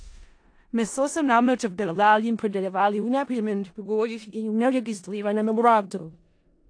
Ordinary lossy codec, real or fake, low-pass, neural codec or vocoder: AAC, 48 kbps; fake; 9.9 kHz; codec, 16 kHz in and 24 kHz out, 0.4 kbps, LongCat-Audio-Codec, four codebook decoder